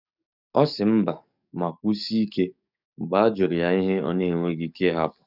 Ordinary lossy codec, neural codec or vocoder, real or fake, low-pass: none; codec, 44.1 kHz, 7.8 kbps, DAC; fake; 5.4 kHz